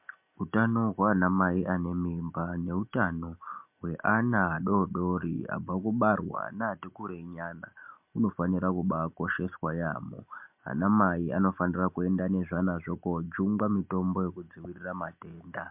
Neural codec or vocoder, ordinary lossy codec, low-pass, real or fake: none; MP3, 32 kbps; 3.6 kHz; real